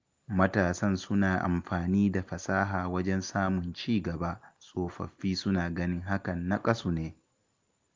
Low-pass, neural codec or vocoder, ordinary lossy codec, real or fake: 7.2 kHz; none; Opus, 32 kbps; real